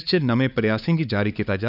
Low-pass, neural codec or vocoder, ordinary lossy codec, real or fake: 5.4 kHz; codec, 16 kHz, 4 kbps, X-Codec, HuBERT features, trained on LibriSpeech; none; fake